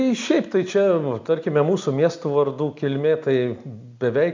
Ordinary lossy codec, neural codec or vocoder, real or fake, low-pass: MP3, 64 kbps; none; real; 7.2 kHz